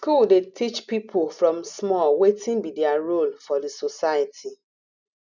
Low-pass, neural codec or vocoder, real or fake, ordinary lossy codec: 7.2 kHz; none; real; none